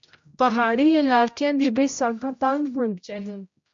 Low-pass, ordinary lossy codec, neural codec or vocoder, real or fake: 7.2 kHz; MP3, 48 kbps; codec, 16 kHz, 0.5 kbps, X-Codec, HuBERT features, trained on general audio; fake